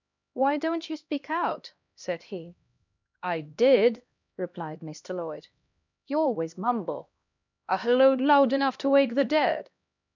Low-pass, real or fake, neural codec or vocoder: 7.2 kHz; fake; codec, 16 kHz, 1 kbps, X-Codec, HuBERT features, trained on LibriSpeech